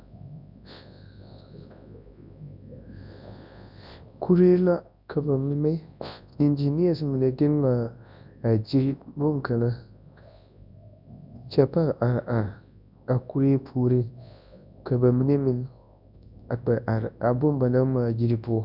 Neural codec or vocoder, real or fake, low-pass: codec, 24 kHz, 0.9 kbps, WavTokenizer, large speech release; fake; 5.4 kHz